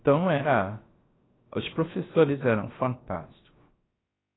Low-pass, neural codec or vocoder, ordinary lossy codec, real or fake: 7.2 kHz; codec, 16 kHz, about 1 kbps, DyCAST, with the encoder's durations; AAC, 16 kbps; fake